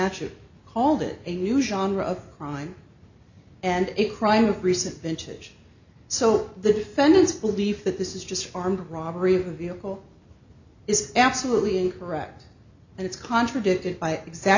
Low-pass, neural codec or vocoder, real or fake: 7.2 kHz; none; real